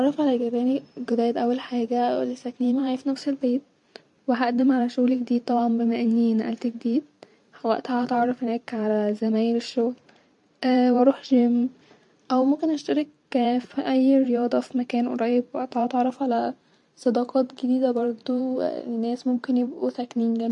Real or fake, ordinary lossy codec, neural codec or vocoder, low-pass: fake; MP3, 64 kbps; vocoder, 44.1 kHz, 128 mel bands every 512 samples, BigVGAN v2; 10.8 kHz